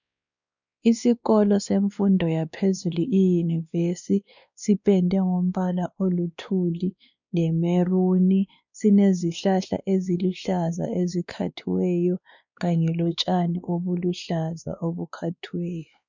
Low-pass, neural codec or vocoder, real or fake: 7.2 kHz; codec, 16 kHz, 2 kbps, X-Codec, WavLM features, trained on Multilingual LibriSpeech; fake